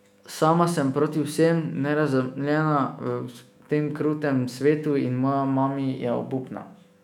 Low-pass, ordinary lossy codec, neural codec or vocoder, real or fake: 19.8 kHz; none; autoencoder, 48 kHz, 128 numbers a frame, DAC-VAE, trained on Japanese speech; fake